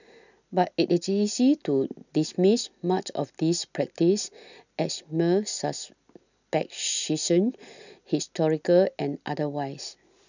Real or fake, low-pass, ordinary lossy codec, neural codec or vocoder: real; 7.2 kHz; none; none